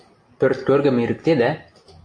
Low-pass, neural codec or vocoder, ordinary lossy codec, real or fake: 9.9 kHz; none; AAC, 48 kbps; real